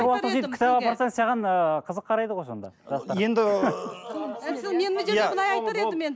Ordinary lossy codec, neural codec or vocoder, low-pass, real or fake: none; none; none; real